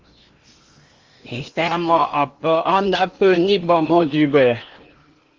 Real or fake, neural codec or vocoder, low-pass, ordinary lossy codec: fake; codec, 16 kHz in and 24 kHz out, 0.8 kbps, FocalCodec, streaming, 65536 codes; 7.2 kHz; Opus, 32 kbps